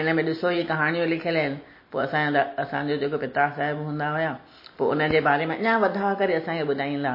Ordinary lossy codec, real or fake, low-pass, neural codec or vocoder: MP3, 24 kbps; real; 5.4 kHz; none